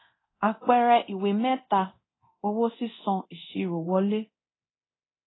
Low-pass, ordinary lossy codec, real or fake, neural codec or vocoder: 7.2 kHz; AAC, 16 kbps; fake; codec, 24 kHz, 0.9 kbps, DualCodec